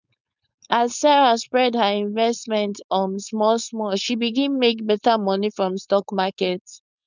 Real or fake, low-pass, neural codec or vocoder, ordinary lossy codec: fake; 7.2 kHz; codec, 16 kHz, 4.8 kbps, FACodec; none